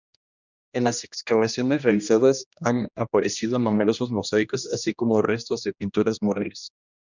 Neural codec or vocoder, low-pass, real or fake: codec, 16 kHz, 1 kbps, X-Codec, HuBERT features, trained on general audio; 7.2 kHz; fake